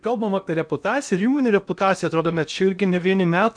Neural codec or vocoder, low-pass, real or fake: codec, 16 kHz in and 24 kHz out, 0.8 kbps, FocalCodec, streaming, 65536 codes; 9.9 kHz; fake